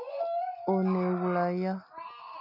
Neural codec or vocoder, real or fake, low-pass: none; real; 5.4 kHz